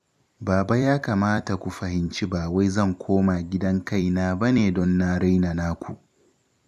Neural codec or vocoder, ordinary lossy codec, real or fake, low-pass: vocoder, 44.1 kHz, 128 mel bands every 256 samples, BigVGAN v2; none; fake; 14.4 kHz